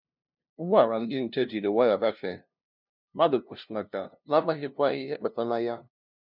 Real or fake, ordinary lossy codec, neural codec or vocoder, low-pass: fake; none; codec, 16 kHz, 0.5 kbps, FunCodec, trained on LibriTTS, 25 frames a second; 5.4 kHz